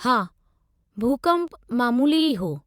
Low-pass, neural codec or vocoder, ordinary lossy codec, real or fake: 19.8 kHz; vocoder, 44.1 kHz, 128 mel bands every 512 samples, BigVGAN v2; Opus, 64 kbps; fake